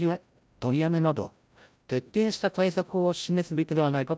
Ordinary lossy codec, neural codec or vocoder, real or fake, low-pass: none; codec, 16 kHz, 0.5 kbps, FreqCodec, larger model; fake; none